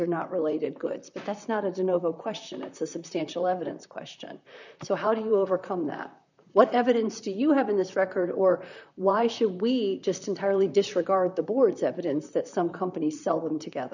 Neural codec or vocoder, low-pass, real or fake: vocoder, 44.1 kHz, 128 mel bands, Pupu-Vocoder; 7.2 kHz; fake